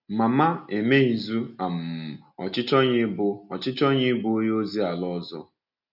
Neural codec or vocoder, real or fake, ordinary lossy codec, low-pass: none; real; none; 5.4 kHz